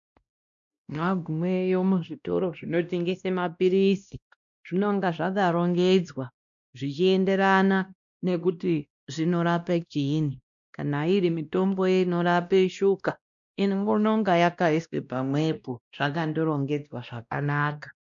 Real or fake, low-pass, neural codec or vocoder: fake; 7.2 kHz; codec, 16 kHz, 1 kbps, X-Codec, WavLM features, trained on Multilingual LibriSpeech